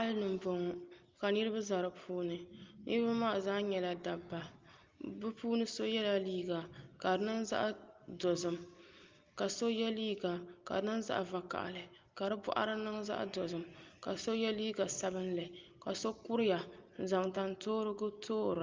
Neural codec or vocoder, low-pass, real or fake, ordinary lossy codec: none; 7.2 kHz; real; Opus, 32 kbps